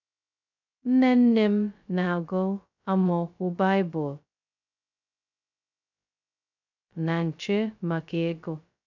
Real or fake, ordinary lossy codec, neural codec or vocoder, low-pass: fake; none; codec, 16 kHz, 0.2 kbps, FocalCodec; 7.2 kHz